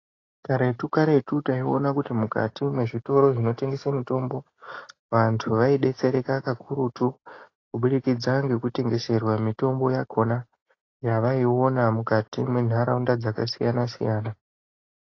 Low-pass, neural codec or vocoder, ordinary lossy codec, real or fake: 7.2 kHz; none; AAC, 32 kbps; real